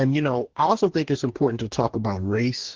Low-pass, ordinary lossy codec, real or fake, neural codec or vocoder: 7.2 kHz; Opus, 16 kbps; fake; codec, 44.1 kHz, 2.6 kbps, DAC